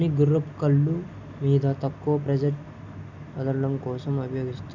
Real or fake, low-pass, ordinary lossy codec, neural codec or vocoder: real; 7.2 kHz; none; none